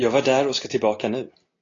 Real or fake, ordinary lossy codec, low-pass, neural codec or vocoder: real; AAC, 32 kbps; 7.2 kHz; none